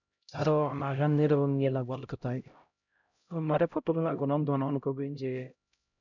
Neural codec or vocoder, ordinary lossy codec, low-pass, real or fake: codec, 16 kHz, 0.5 kbps, X-Codec, HuBERT features, trained on LibriSpeech; none; 7.2 kHz; fake